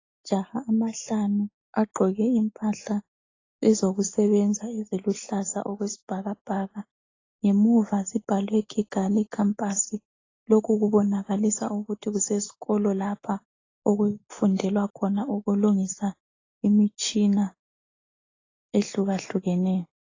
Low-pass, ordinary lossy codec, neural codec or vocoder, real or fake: 7.2 kHz; AAC, 32 kbps; none; real